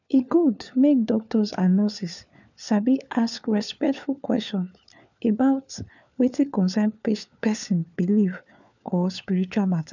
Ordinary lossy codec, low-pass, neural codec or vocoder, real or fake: none; 7.2 kHz; codec, 16 kHz, 4 kbps, FunCodec, trained on LibriTTS, 50 frames a second; fake